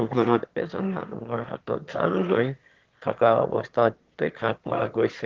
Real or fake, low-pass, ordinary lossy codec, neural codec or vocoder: fake; 7.2 kHz; Opus, 16 kbps; autoencoder, 22.05 kHz, a latent of 192 numbers a frame, VITS, trained on one speaker